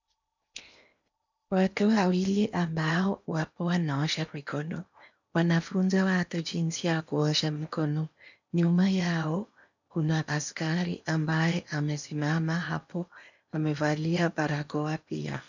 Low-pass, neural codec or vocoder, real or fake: 7.2 kHz; codec, 16 kHz in and 24 kHz out, 0.8 kbps, FocalCodec, streaming, 65536 codes; fake